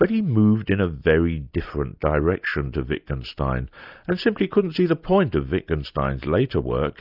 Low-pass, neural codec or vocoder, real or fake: 5.4 kHz; none; real